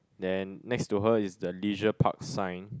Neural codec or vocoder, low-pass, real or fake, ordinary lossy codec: none; none; real; none